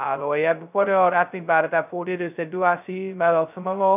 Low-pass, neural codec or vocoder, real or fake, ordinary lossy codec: 3.6 kHz; codec, 16 kHz, 0.2 kbps, FocalCodec; fake; none